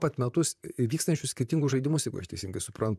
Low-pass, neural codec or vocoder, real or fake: 14.4 kHz; vocoder, 44.1 kHz, 128 mel bands, Pupu-Vocoder; fake